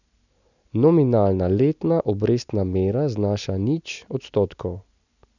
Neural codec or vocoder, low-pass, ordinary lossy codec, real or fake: none; 7.2 kHz; none; real